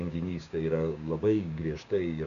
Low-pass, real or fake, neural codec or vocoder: 7.2 kHz; fake; codec, 16 kHz, 6 kbps, DAC